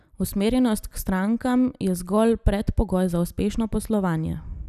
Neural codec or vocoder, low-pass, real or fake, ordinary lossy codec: none; 14.4 kHz; real; none